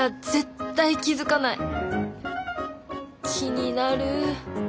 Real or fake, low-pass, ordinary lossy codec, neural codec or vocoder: real; none; none; none